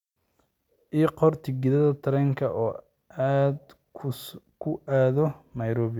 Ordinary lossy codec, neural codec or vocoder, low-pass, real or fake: none; none; 19.8 kHz; real